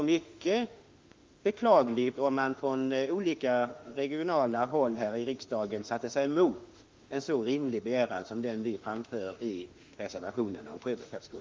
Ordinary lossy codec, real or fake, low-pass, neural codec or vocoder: Opus, 32 kbps; fake; 7.2 kHz; autoencoder, 48 kHz, 32 numbers a frame, DAC-VAE, trained on Japanese speech